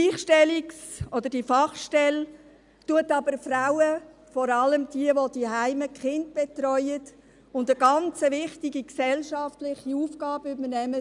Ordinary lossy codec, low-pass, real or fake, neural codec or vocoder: none; 10.8 kHz; real; none